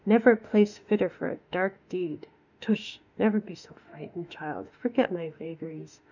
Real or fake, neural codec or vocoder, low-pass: fake; autoencoder, 48 kHz, 32 numbers a frame, DAC-VAE, trained on Japanese speech; 7.2 kHz